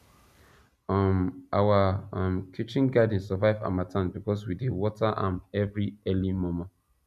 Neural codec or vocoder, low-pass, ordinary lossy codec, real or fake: none; 14.4 kHz; none; real